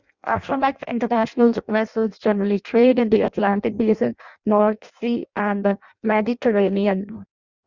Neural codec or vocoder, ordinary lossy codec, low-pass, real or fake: codec, 16 kHz in and 24 kHz out, 0.6 kbps, FireRedTTS-2 codec; Opus, 64 kbps; 7.2 kHz; fake